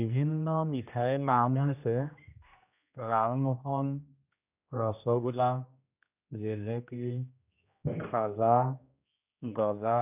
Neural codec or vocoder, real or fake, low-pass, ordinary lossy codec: codec, 16 kHz, 1 kbps, X-Codec, HuBERT features, trained on general audio; fake; 3.6 kHz; none